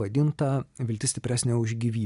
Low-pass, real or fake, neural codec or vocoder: 10.8 kHz; real; none